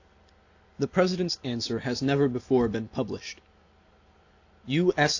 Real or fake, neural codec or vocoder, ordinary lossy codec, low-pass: real; none; AAC, 48 kbps; 7.2 kHz